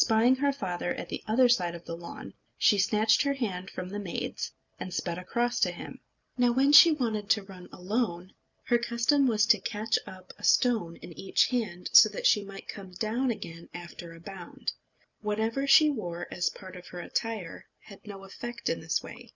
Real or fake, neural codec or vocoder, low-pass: real; none; 7.2 kHz